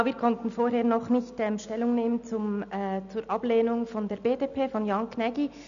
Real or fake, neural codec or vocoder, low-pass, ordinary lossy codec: real; none; 7.2 kHz; none